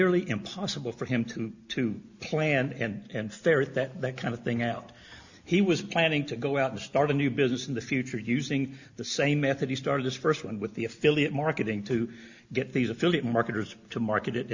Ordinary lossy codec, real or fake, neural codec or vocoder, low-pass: Opus, 64 kbps; real; none; 7.2 kHz